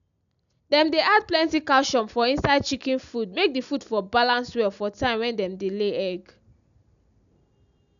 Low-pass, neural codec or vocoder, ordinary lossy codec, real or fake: 7.2 kHz; none; none; real